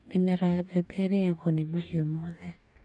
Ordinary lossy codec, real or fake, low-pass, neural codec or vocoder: none; fake; 10.8 kHz; codec, 44.1 kHz, 2.6 kbps, DAC